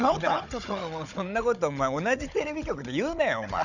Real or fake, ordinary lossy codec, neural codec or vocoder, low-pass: fake; none; codec, 16 kHz, 16 kbps, FunCodec, trained on Chinese and English, 50 frames a second; 7.2 kHz